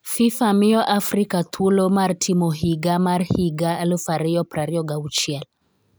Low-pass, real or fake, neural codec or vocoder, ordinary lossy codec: none; real; none; none